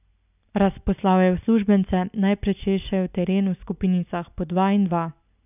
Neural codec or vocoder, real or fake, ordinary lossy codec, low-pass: none; real; none; 3.6 kHz